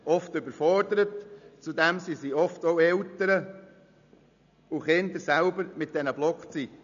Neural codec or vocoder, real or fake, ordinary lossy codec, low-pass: none; real; none; 7.2 kHz